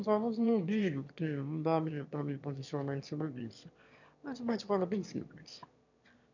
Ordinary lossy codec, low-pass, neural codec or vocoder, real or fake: none; 7.2 kHz; autoencoder, 22.05 kHz, a latent of 192 numbers a frame, VITS, trained on one speaker; fake